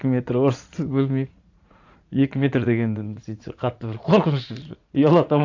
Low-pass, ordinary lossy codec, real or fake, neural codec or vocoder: 7.2 kHz; AAC, 48 kbps; fake; vocoder, 44.1 kHz, 80 mel bands, Vocos